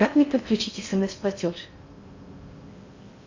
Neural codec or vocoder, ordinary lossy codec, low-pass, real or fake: codec, 16 kHz in and 24 kHz out, 0.6 kbps, FocalCodec, streaming, 4096 codes; MP3, 48 kbps; 7.2 kHz; fake